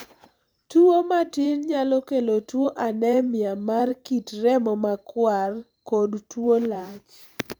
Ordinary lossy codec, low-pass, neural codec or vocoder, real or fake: none; none; vocoder, 44.1 kHz, 128 mel bands every 512 samples, BigVGAN v2; fake